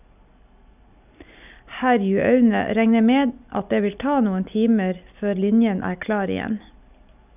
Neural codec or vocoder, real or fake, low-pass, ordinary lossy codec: none; real; 3.6 kHz; none